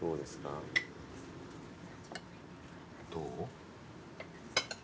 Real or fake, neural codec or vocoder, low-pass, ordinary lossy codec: real; none; none; none